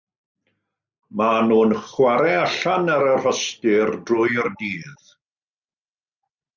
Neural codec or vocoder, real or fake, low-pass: none; real; 7.2 kHz